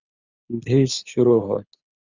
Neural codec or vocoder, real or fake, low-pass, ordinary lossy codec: codec, 24 kHz, 6 kbps, HILCodec; fake; 7.2 kHz; Opus, 64 kbps